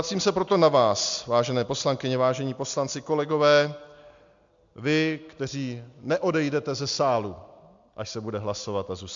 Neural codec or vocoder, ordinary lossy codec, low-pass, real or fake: none; MP3, 64 kbps; 7.2 kHz; real